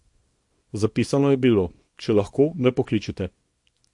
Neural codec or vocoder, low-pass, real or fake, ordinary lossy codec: codec, 24 kHz, 0.9 kbps, WavTokenizer, small release; 10.8 kHz; fake; MP3, 48 kbps